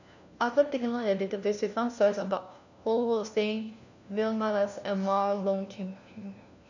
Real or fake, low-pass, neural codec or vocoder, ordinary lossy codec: fake; 7.2 kHz; codec, 16 kHz, 1 kbps, FunCodec, trained on LibriTTS, 50 frames a second; none